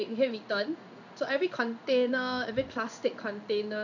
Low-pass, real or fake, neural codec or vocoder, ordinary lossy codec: 7.2 kHz; fake; codec, 16 kHz in and 24 kHz out, 1 kbps, XY-Tokenizer; none